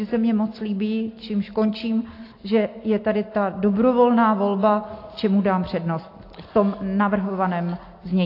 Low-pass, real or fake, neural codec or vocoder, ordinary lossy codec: 5.4 kHz; real; none; AAC, 32 kbps